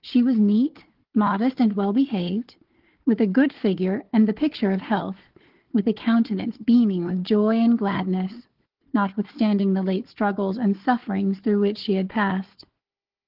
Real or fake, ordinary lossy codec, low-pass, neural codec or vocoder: fake; Opus, 16 kbps; 5.4 kHz; codec, 16 kHz, 4 kbps, FunCodec, trained on Chinese and English, 50 frames a second